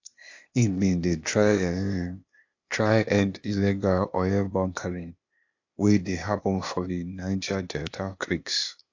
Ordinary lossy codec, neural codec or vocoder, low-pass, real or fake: AAC, 48 kbps; codec, 16 kHz, 0.8 kbps, ZipCodec; 7.2 kHz; fake